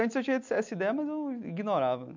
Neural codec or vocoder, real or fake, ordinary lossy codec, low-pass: none; real; MP3, 64 kbps; 7.2 kHz